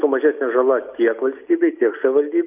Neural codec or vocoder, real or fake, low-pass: none; real; 3.6 kHz